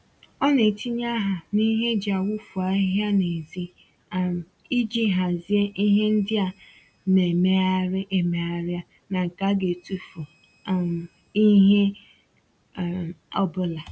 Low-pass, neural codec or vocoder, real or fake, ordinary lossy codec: none; none; real; none